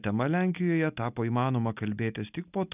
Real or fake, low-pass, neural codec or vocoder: real; 3.6 kHz; none